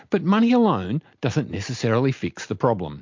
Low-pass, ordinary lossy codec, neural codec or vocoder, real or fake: 7.2 kHz; MP3, 48 kbps; none; real